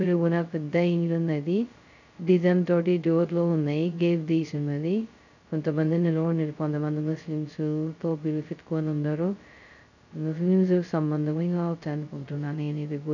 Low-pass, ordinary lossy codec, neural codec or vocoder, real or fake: 7.2 kHz; none; codec, 16 kHz, 0.2 kbps, FocalCodec; fake